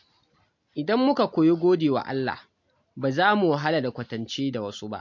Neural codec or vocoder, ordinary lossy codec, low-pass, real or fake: none; MP3, 48 kbps; 7.2 kHz; real